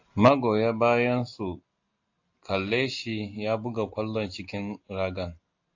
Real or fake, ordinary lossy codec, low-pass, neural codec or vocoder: real; AAC, 48 kbps; 7.2 kHz; none